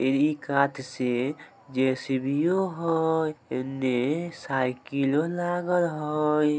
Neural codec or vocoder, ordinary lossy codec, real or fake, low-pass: none; none; real; none